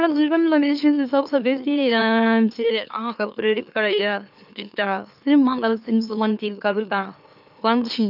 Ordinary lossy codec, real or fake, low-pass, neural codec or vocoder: none; fake; 5.4 kHz; autoencoder, 44.1 kHz, a latent of 192 numbers a frame, MeloTTS